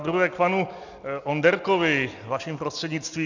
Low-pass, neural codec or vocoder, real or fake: 7.2 kHz; none; real